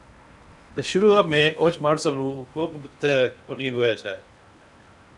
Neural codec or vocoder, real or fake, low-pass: codec, 16 kHz in and 24 kHz out, 0.8 kbps, FocalCodec, streaming, 65536 codes; fake; 10.8 kHz